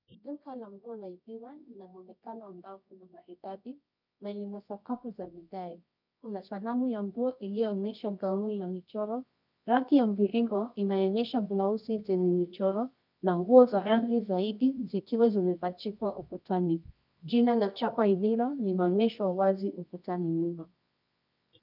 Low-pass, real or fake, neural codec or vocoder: 5.4 kHz; fake; codec, 24 kHz, 0.9 kbps, WavTokenizer, medium music audio release